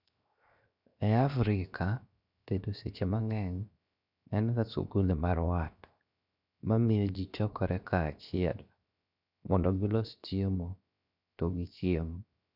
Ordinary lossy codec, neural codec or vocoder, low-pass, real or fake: none; codec, 16 kHz, 0.7 kbps, FocalCodec; 5.4 kHz; fake